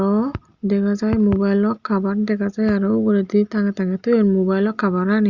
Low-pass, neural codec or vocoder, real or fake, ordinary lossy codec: 7.2 kHz; none; real; Opus, 64 kbps